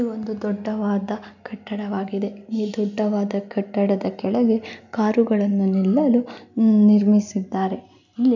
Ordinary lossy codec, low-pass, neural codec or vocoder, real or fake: none; 7.2 kHz; none; real